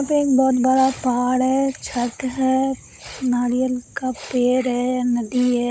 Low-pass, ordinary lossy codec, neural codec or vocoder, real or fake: none; none; codec, 16 kHz, 16 kbps, FunCodec, trained on Chinese and English, 50 frames a second; fake